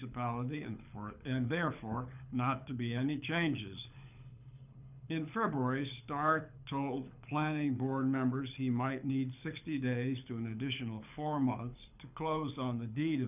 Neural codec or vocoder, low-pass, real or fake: codec, 24 kHz, 6 kbps, HILCodec; 3.6 kHz; fake